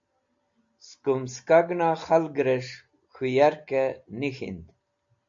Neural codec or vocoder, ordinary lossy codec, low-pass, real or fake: none; AAC, 64 kbps; 7.2 kHz; real